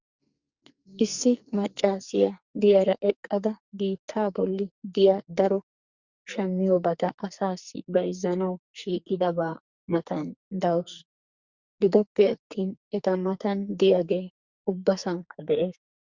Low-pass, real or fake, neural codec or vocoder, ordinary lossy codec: 7.2 kHz; fake; codec, 44.1 kHz, 2.6 kbps, SNAC; Opus, 64 kbps